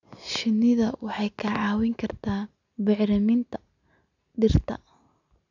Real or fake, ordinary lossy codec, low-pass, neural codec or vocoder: real; none; 7.2 kHz; none